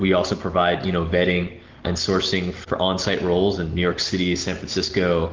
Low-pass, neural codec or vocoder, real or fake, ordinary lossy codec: 7.2 kHz; none; real; Opus, 16 kbps